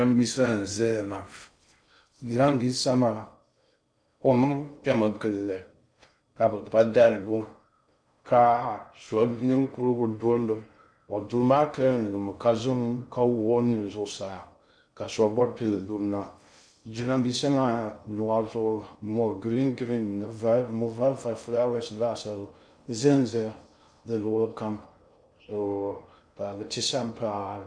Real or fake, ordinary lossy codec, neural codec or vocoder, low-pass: fake; MP3, 96 kbps; codec, 16 kHz in and 24 kHz out, 0.6 kbps, FocalCodec, streaming, 2048 codes; 9.9 kHz